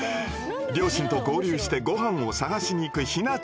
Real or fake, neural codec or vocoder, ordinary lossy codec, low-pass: real; none; none; none